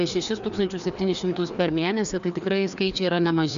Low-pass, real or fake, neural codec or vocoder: 7.2 kHz; fake; codec, 16 kHz, 2 kbps, FreqCodec, larger model